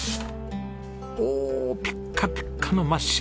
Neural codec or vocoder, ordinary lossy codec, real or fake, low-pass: none; none; real; none